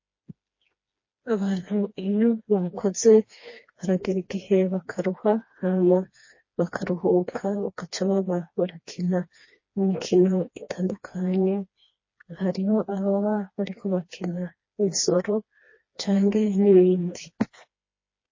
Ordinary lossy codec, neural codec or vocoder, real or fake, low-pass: MP3, 32 kbps; codec, 16 kHz, 2 kbps, FreqCodec, smaller model; fake; 7.2 kHz